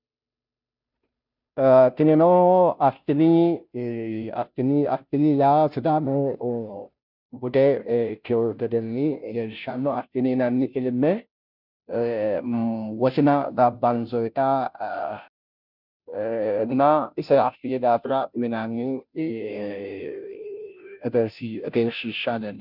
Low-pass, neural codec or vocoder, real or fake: 5.4 kHz; codec, 16 kHz, 0.5 kbps, FunCodec, trained on Chinese and English, 25 frames a second; fake